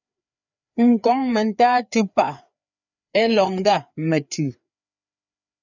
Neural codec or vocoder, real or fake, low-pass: codec, 16 kHz, 4 kbps, FreqCodec, larger model; fake; 7.2 kHz